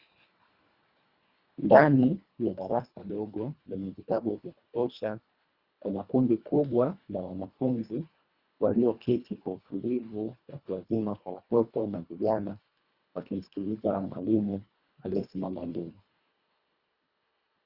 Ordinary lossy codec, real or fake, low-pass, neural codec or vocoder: Opus, 64 kbps; fake; 5.4 kHz; codec, 24 kHz, 1.5 kbps, HILCodec